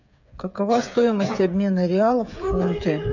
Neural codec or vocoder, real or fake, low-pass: codec, 16 kHz, 8 kbps, FreqCodec, smaller model; fake; 7.2 kHz